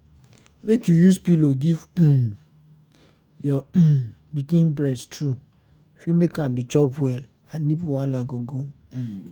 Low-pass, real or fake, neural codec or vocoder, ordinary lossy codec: 19.8 kHz; fake; codec, 44.1 kHz, 2.6 kbps, DAC; none